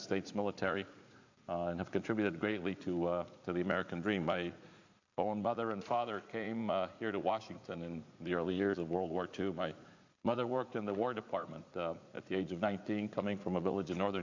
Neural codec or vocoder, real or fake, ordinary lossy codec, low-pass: vocoder, 44.1 kHz, 80 mel bands, Vocos; fake; AAC, 48 kbps; 7.2 kHz